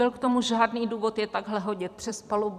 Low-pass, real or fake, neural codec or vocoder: 14.4 kHz; fake; vocoder, 44.1 kHz, 128 mel bands every 256 samples, BigVGAN v2